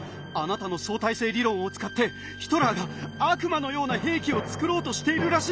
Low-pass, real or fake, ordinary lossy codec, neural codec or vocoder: none; real; none; none